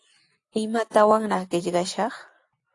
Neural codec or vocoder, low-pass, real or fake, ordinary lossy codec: none; 10.8 kHz; real; AAC, 48 kbps